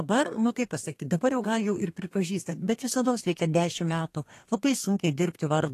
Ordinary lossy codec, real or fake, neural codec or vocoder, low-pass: AAC, 48 kbps; fake; codec, 32 kHz, 1.9 kbps, SNAC; 14.4 kHz